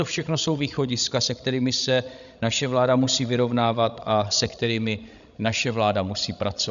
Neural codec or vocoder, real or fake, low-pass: codec, 16 kHz, 16 kbps, FreqCodec, larger model; fake; 7.2 kHz